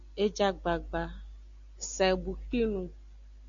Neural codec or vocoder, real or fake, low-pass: none; real; 7.2 kHz